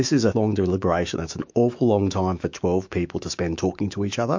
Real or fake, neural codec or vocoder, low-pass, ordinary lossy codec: fake; autoencoder, 48 kHz, 128 numbers a frame, DAC-VAE, trained on Japanese speech; 7.2 kHz; MP3, 48 kbps